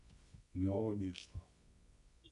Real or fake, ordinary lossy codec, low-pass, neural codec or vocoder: fake; none; 10.8 kHz; codec, 24 kHz, 0.9 kbps, WavTokenizer, medium music audio release